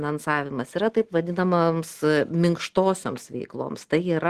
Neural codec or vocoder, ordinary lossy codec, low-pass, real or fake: none; Opus, 16 kbps; 14.4 kHz; real